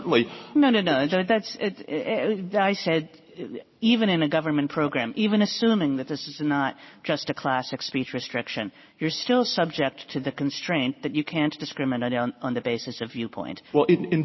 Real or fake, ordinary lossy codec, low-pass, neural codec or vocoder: real; MP3, 24 kbps; 7.2 kHz; none